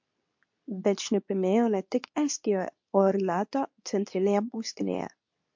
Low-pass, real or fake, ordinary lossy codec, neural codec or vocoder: 7.2 kHz; fake; MP3, 48 kbps; codec, 24 kHz, 0.9 kbps, WavTokenizer, medium speech release version 2